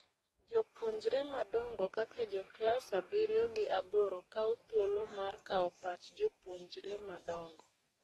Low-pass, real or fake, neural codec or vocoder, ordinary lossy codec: 19.8 kHz; fake; codec, 44.1 kHz, 2.6 kbps, DAC; AAC, 32 kbps